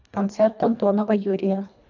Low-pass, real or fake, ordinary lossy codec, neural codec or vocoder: 7.2 kHz; fake; none; codec, 24 kHz, 1.5 kbps, HILCodec